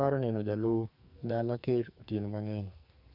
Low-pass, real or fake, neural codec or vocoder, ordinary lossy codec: 5.4 kHz; fake; codec, 44.1 kHz, 2.6 kbps, SNAC; none